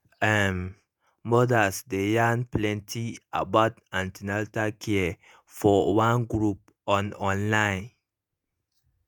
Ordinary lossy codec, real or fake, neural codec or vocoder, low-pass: none; fake; vocoder, 48 kHz, 128 mel bands, Vocos; none